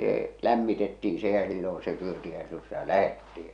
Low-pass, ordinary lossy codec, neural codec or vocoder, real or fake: 9.9 kHz; none; none; real